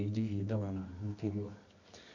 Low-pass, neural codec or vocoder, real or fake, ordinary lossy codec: 7.2 kHz; codec, 24 kHz, 0.9 kbps, WavTokenizer, medium music audio release; fake; none